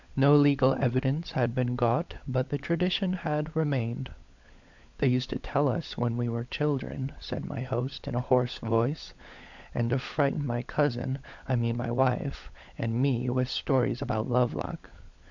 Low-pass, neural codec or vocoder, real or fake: 7.2 kHz; codec, 16 kHz, 16 kbps, FunCodec, trained on LibriTTS, 50 frames a second; fake